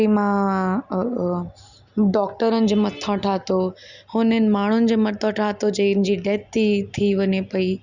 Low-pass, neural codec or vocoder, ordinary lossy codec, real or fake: 7.2 kHz; none; Opus, 64 kbps; real